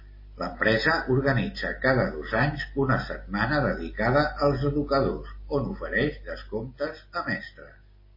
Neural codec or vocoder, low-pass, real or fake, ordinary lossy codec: none; 5.4 kHz; real; MP3, 24 kbps